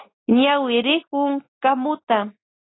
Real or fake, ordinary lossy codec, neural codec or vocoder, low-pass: real; AAC, 16 kbps; none; 7.2 kHz